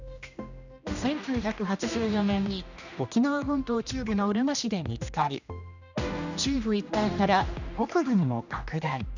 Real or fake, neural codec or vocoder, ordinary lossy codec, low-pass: fake; codec, 16 kHz, 1 kbps, X-Codec, HuBERT features, trained on general audio; none; 7.2 kHz